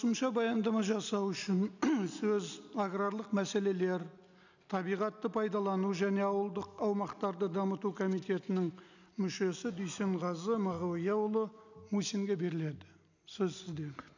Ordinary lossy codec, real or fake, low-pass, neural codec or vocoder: none; real; 7.2 kHz; none